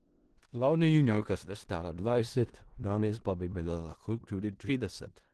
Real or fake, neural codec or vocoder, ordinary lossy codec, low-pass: fake; codec, 16 kHz in and 24 kHz out, 0.4 kbps, LongCat-Audio-Codec, four codebook decoder; Opus, 16 kbps; 10.8 kHz